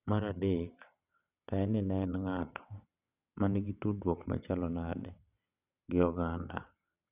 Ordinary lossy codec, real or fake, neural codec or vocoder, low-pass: none; fake; vocoder, 22.05 kHz, 80 mel bands, WaveNeXt; 3.6 kHz